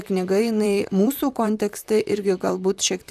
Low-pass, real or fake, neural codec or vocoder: 14.4 kHz; fake; vocoder, 44.1 kHz, 128 mel bands, Pupu-Vocoder